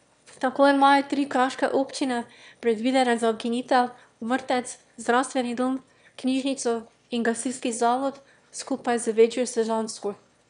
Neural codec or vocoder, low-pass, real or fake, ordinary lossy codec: autoencoder, 22.05 kHz, a latent of 192 numbers a frame, VITS, trained on one speaker; 9.9 kHz; fake; none